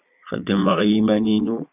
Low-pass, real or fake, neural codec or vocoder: 3.6 kHz; fake; vocoder, 22.05 kHz, 80 mel bands, Vocos